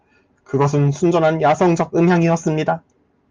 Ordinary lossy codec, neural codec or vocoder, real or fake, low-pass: Opus, 24 kbps; none; real; 7.2 kHz